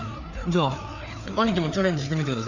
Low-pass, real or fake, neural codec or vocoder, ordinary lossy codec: 7.2 kHz; fake; codec, 16 kHz, 4 kbps, FreqCodec, larger model; none